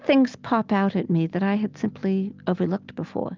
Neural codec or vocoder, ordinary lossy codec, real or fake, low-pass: none; Opus, 24 kbps; real; 7.2 kHz